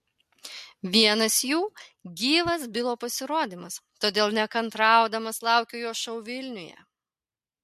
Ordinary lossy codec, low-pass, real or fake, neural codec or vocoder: MP3, 64 kbps; 14.4 kHz; real; none